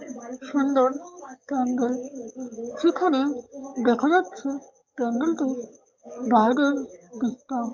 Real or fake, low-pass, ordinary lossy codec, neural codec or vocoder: fake; 7.2 kHz; MP3, 64 kbps; codec, 44.1 kHz, 7.8 kbps, DAC